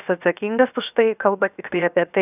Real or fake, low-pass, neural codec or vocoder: fake; 3.6 kHz; codec, 16 kHz, 0.7 kbps, FocalCodec